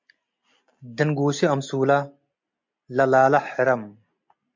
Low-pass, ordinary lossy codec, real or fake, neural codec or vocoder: 7.2 kHz; MP3, 48 kbps; real; none